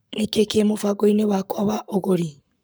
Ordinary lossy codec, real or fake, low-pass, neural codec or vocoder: none; fake; none; codec, 44.1 kHz, 7.8 kbps, Pupu-Codec